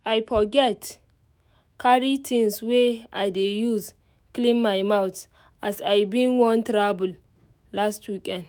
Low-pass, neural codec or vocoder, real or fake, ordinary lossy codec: none; autoencoder, 48 kHz, 128 numbers a frame, DAC-VAE, trained on Japanese speech; fake; none